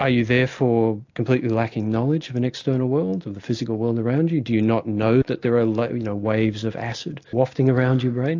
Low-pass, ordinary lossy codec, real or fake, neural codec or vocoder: 7.2 kHz; AAC, 48 kbps; real; none